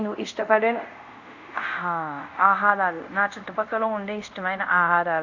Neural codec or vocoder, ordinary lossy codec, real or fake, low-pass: codec, 24 kHz, 0.5 kbps, DualCodec; none; fake; 7.2 kHz